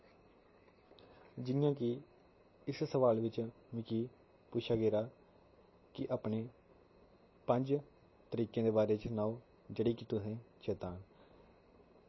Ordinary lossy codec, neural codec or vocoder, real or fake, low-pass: MP3, 24 kbps; none; real; 7.2 kHz